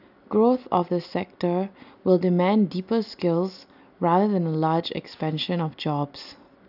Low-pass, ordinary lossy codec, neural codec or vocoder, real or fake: 5.4 kHz; none; none; real